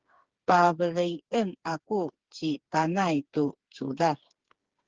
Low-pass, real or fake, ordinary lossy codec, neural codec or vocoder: 7.2 kHz; fake; Opus, 16 kbps; codec, 16 kHz, 4 kbps, FreqCodec, smaller model